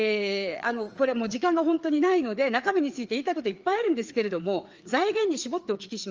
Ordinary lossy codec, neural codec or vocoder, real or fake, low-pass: Opus, 24 kbps; codec, 16 kHz, 4 kbps, FreqCodec, larger model; fake; 7.2 kHz